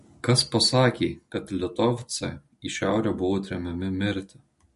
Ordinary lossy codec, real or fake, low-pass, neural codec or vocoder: MP3, 48 kbps; real; 14.4 kHz; none